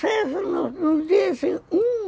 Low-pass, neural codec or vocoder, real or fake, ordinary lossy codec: none; none; real; none